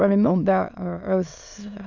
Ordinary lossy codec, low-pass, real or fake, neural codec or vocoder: none; 7.2 kHz; fake; autoencoder, 22.05 kHz, a latent of 192 numbers a frame, VITS, trained on many speakers